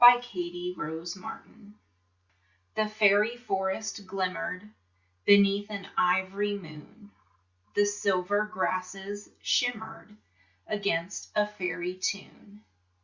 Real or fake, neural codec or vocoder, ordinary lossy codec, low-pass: real; none; Opus, 64 kbps; 7.2 kHz